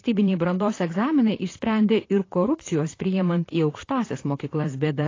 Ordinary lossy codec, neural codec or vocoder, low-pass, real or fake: AAC, 32 kbps; vocoder, 44.1 kHz, 128 mel bands, Pupu-Vocoder; 7.2 kHz; fake